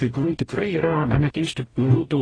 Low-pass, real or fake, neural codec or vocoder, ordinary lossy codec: 9.9 kHz; fake; codec, 44.1 kHz, 0.9 kbps, DAC; AAC, 32 kbps